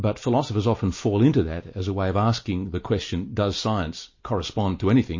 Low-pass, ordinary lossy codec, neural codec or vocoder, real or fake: 7.2 kHz; MP3, 32 kbps; none; real